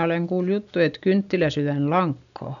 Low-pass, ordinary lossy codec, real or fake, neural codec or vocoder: 7.2 kHz; none; real; none